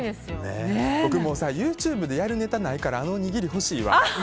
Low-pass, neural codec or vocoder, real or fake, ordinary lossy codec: none; none; real; none